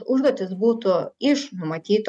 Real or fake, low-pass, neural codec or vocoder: real; 10.8 kHz; none